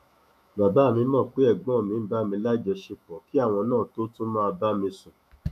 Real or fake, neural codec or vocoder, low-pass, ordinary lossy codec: fake; autoencoder, 48 kHz, 128 numbers a frame, DAC-VAE, trained on Japanese speech; 14.4 kHz; none